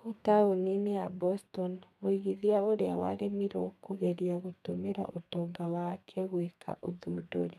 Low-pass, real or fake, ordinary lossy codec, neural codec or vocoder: 14.4 kHz; fake; none; codec, 32 kHz, 1.9 kbps, SNAC